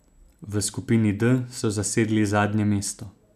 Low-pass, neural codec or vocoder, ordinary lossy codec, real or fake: 14.4 kHz; none; none; real